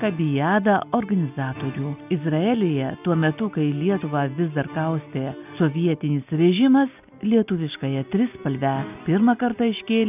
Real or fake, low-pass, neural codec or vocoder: real; 3.6 kHz; none